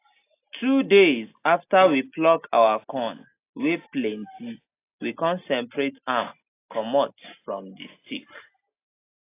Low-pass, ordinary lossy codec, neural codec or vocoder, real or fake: 3.6 kHz; AAC, 24 kbps; none; real